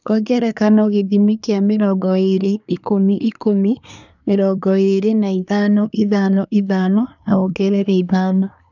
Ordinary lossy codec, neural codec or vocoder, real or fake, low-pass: none; codec, 24 kHz, 1 kbps, SNAC; fake; 7.2 kHz